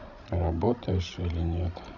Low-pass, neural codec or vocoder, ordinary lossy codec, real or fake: 7.2 kHz; codec, 16 kHz, 16 kbps, FreqCodec, larger model; none; fake